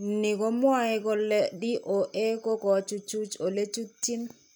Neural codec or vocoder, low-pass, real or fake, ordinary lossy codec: none; none; real; none